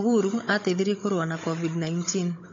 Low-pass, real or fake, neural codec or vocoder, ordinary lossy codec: 7.2 kHz; fake; codec, 16 kHz, 16 kbps, FunCodec, trained on Chinese and English, 50 frames a second; AAC, 32 kbps